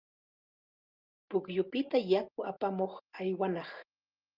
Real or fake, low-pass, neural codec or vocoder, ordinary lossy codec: real; 5.4 kHz; none; Opus, 32 kbps